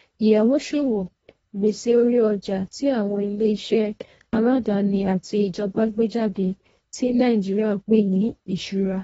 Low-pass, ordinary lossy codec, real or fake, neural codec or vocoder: 10.8 kHz; AAC, 24 kbps; fake; codec, 24 kHz, 1.5 kbps, HILCodec